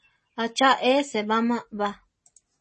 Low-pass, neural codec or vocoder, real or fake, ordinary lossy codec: 10.8 kHz; none; real; MP3, 32 kbps